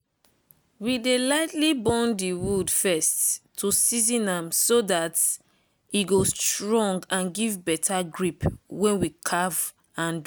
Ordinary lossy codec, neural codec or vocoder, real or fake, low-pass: none; none; real; none